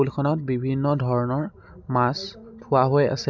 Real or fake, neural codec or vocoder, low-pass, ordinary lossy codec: real; none; 7.2 kHz; none